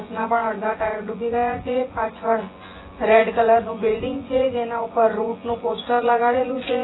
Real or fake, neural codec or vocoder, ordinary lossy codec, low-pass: fake; vocoder, 24 kHz, 100 mel bands, Vocos; AAC, 16 kbps; 7.2 kHz